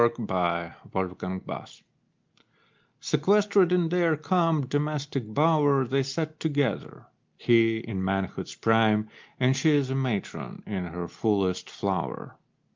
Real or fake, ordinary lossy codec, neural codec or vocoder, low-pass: real; Opus, 32 kbps; none; 7.2 kHz